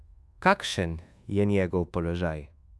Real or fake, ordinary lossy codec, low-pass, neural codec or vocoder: fake; none; none; codec, 24 kHz, 1.2 kbps, DualCodec